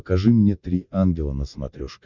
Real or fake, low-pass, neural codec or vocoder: real; 7.2 kHz; none